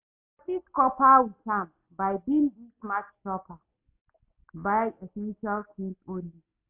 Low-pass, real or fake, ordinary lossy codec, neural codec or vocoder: 3.6 kHz; real; MP3, 32 kbps; none